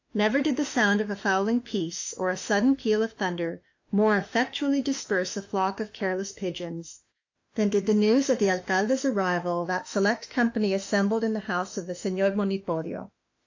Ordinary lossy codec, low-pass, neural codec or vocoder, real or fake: AAC, 48 kbps; 7.2 kHz; autoencoder, 48 kHz, 32 numbers a frame, DAC-VAE, trained on Japanese speech; fake